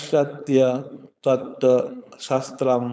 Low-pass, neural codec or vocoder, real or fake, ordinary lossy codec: none; codec, 16 kHz, 4.8 kbps, FACodec; fake; none